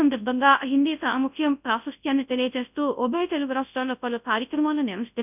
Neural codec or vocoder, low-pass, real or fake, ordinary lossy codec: codec, 24 kHz, 0.9 kbps, WavTokenizer, large speech release; 3.6 kHz; fake; none